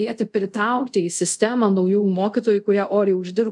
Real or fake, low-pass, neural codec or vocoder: fake; 10.8 kHz; codec, 24 kHz, 0.5 kbps, DualCodec